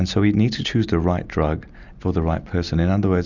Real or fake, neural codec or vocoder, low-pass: real; none; 7.2 kHz